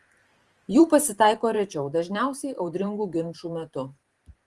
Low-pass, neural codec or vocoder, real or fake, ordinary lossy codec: 10.8 kHz; vocoder, 44.1 kHz, 128 mel bands every 512 samples, BigVGAN v2; fake; Opus, 24 kbps